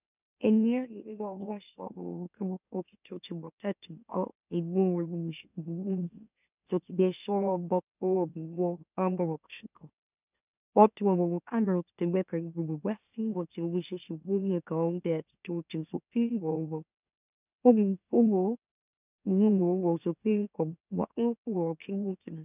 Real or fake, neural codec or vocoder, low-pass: fake; autoencoder, 44.1 kHz, a latent of 192 numbers a frame, MeloTTS; 3.6 kHz